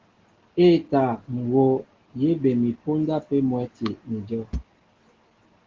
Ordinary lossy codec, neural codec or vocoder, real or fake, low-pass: Opus, 16 kbps; none; real; 7.2 kHz